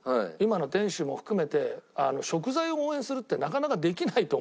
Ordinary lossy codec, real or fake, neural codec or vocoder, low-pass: none; real; none; none